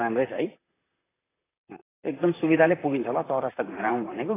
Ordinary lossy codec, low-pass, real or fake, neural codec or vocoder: AAC, 16 kbps; 3.6 kHz; fake; vocoder, 44.1 kHz, 128 mel bands, Pupu-Vocoder